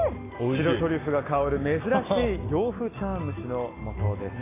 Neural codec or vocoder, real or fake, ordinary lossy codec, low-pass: none; real; AAC, 16 kbps; 3.6 kHz